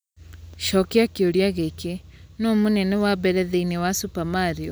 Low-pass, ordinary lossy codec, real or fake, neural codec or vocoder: none; none; real; none